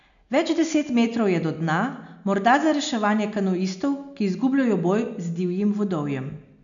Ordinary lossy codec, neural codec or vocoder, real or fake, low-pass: none; none; real; 7.2 kHz